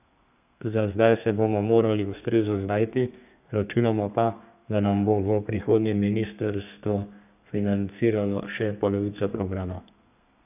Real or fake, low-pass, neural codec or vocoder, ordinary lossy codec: fake; 3.6 kHz; codec, 32 kHz, 1.9 kbps, SNAC; none